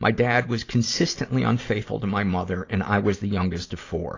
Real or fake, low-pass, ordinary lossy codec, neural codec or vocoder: real; 7.2 kHz; AAC, 32 kbps; none